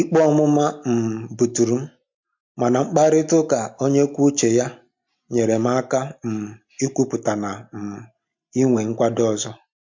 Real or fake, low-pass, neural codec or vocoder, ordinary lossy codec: real; 7.2 kHz; none; MP3, 48 kbps